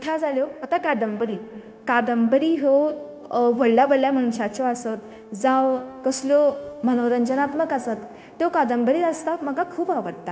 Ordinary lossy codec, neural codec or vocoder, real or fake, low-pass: none; codec, 16 kHz, 0.9 kbps, LongCat-Audio-Codec; fake; none